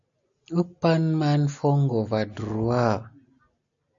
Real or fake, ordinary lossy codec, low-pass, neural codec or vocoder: real; MP3, 64 kbps; 7.2 kHz; none